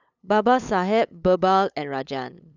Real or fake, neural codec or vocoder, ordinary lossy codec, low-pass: fake; codec, 16 kHz, 8 kbps, FunCodec, trained on LibriTTS, 25 frames a second; none; 7.2 kHz